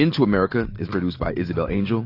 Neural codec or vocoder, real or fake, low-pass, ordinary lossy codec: none; real; 5.4 kHz; AAC, 32 kbps